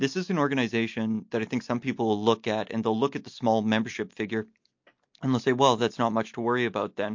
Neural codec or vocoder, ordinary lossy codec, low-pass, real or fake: none; MP3, 48 kbps; 7.2 kHz; real